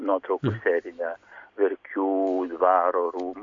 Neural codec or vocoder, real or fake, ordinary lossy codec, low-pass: none; real; MP3, 48 kbps; 7.2 kHz